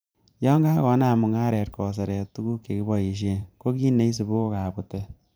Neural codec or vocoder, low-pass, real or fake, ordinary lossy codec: vocoder, 44.1 kHz, 128 mel bands every 512 samples, BigVGAN v2; none; fake; none